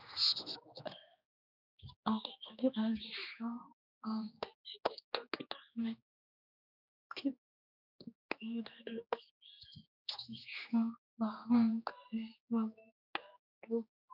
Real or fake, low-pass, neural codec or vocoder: fake; 5.4 kHz; codec, 16 kHz, 2 kbps, X-Codec, HuBERT features, trained on general audio